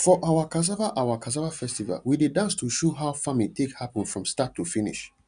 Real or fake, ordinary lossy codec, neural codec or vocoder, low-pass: real; none; none; 9.9 kHz